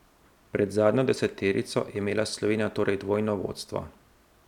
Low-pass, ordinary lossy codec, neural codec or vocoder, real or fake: 19.8 kHz; none; none; real